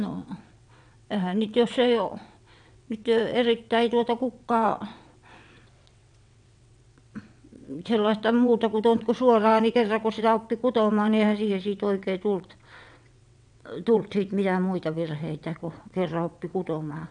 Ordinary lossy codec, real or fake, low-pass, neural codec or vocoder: none; fake; 9.9 kHz; vocoder, 22.05 kHz, 80 mel bands, WaveNeXt